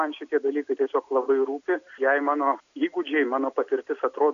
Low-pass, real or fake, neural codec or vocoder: 7.2 kHz; real; none